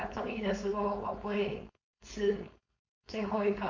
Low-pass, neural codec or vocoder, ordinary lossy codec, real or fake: 7.2 kHz; codec, 16 kHz, 4.8 kbps, FACodec; MP3, 64 kbps; fake